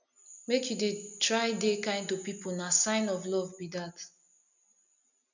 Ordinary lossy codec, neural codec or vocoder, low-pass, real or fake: none; none; 7.2 kHz; real